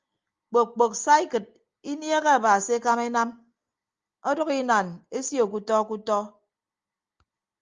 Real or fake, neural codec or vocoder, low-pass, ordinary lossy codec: real; none; 7.2 kHz; Opus, 32 kbps